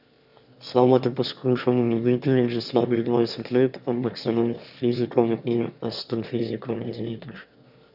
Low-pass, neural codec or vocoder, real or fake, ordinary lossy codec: 5.4 kHz; autoencoder, 22.05 kHz, a latent of 192 numbers a frame, VITS, trained on one speaker; fake; none